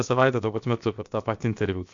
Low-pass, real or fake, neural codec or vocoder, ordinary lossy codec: 7.2 kHz; fake; codec, 16 kHz, about 1 kbps, DyCAST, with the encoder's durations; MP3, 64 kbps